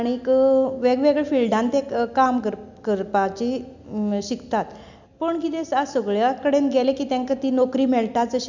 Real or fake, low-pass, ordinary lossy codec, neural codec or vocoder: real; 7.2 kHz; none; none